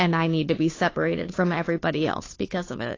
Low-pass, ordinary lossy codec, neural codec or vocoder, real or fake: 7.2 kHz; AAC, 32 kbps; codec, 16 kHz, 2 kbps, FunCodec, trained on Chinese and English, 25 frames a second; fake